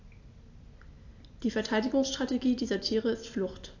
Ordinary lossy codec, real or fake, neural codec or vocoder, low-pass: AAC, 48 kbps; real; none; 7.2 kHz